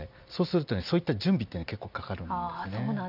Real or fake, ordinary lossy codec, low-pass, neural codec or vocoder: real; none; 5.4 kHz; none